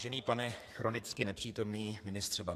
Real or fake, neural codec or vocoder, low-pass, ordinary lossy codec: fake; codec, 32 kHz, 1.9 kbps, SNAC; 14.4 kHz; AAC, 64 kbps